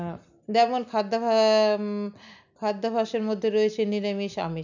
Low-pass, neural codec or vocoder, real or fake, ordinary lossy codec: 7.2 kHz; none; real; none